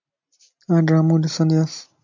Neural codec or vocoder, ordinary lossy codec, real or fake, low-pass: none; MP3, 64 kbps; real; 7.2 kHz